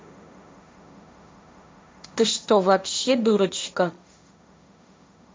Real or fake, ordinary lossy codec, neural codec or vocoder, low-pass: fake; none; codec, 16 kHz, 1.1 kbps, Voila-Tokenizer; none